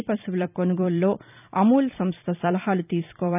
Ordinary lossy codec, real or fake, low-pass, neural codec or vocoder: none; fake; 3.6 kHz; vocoder, 44.1 kHz, 128 mel bands every 256 samples, BigVGAN v2